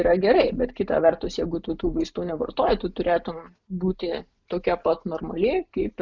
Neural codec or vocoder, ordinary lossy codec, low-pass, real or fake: none; Opus, 64 kbps; 7.2 kHz; real